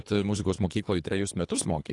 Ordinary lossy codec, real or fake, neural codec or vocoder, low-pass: AAC, 48 kbps; fake; codec, 24 kHz, 3 kbps, HILCodec; 10.8 kHz